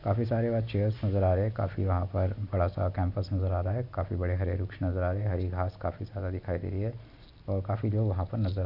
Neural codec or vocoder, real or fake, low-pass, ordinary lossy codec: none; real; 5.4 kHz; none